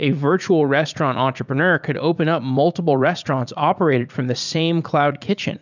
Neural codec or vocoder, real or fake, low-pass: vocoder, 44.1 kHz, 80 mel bands, Vocos; fake; 7.2 kHz